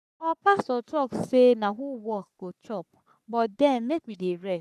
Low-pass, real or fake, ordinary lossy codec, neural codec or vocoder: 14.4 kHz; fake; none; codec, 44.1 kHz, 3.4 kbps, Pupu-Codec